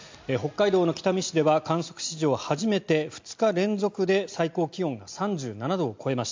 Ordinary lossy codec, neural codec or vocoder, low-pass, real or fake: MP3, 64 kbps; none; 7.2 kHz; real